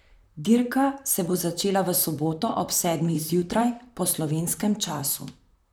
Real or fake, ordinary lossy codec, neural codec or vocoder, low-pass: fake; none; vocoder, 44.1 kHz, 128 mel bands, Pupu-Vocoder; none